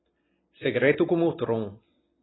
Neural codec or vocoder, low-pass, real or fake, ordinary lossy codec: none; 7.2 kHz; real; AAC, 16 kbps